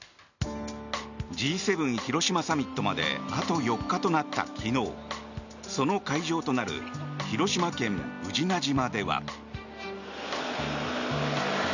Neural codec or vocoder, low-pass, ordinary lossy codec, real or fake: none; 7.2 kHz; none; real